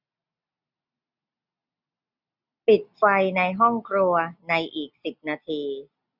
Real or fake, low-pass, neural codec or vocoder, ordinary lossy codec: real; 5.4 kHz; none; none